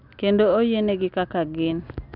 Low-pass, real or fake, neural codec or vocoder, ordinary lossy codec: 5.4 kHz; real; none; none